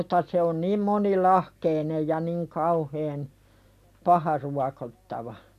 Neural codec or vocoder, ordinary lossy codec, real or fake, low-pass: none; none; real; 14.4 kHz